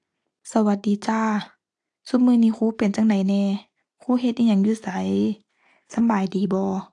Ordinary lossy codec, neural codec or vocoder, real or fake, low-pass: AAC, 64 kbps; none; real; 10.8 kHz